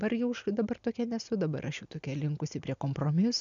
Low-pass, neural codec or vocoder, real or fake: 7.2 kHz; none; real